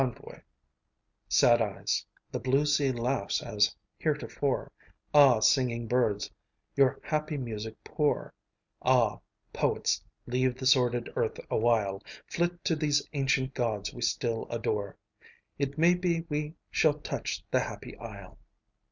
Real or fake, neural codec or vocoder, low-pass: real; none; 7.2 kHz